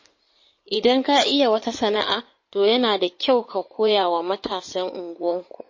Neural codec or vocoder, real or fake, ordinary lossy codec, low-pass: codec, 16 kHz in and 24 kHz out, 2.2 kbps, FireRedTTS-2 codec; fake; MP3, 32 kbps; 7.2 kHz